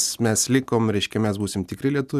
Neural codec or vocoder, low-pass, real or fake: none; 14.4 kHz; real